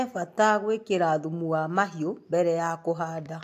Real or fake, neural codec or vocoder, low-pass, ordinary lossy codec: real; none; 14.4 kHz; AAC, 64 kbps